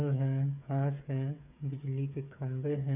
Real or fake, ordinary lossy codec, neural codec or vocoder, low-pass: fake; none; codec, 16 kHz, 8 kbps, FreqCodec, smaller model; 3.6 kHz